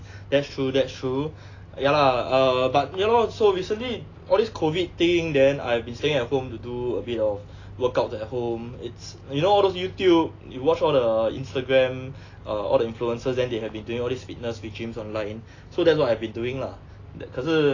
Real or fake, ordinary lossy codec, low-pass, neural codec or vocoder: real; AAC, 32 kbps; 7.2 kHz; none